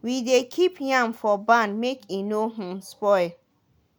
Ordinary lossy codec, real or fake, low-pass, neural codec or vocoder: none; real; none; none